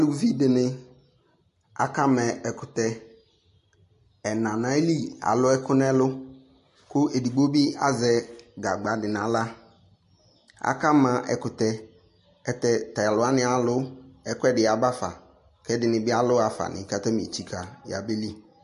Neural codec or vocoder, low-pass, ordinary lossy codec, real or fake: none; 14.4 kHz; MP3, 48 kbps; real